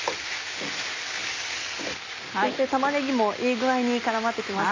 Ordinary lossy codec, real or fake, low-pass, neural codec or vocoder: AAC, 32 kbps; real; 7.2 kHz; none